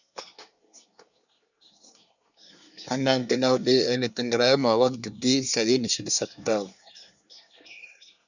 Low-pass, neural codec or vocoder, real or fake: 7.2 kHz; codec, 24 kHz, 1 kbps, SNAC; fake